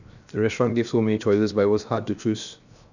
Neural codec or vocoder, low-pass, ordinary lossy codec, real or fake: codec, 16 kHz, 0.7 kbps, FocalCodec; 7.2 kHz; none; fake